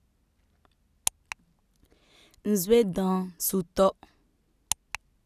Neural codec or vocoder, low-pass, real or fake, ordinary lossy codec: none; 14.4 kHz; real; none